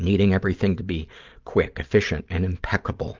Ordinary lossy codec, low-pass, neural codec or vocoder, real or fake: Opus, 32 kbps; 7.2 kHz; none; real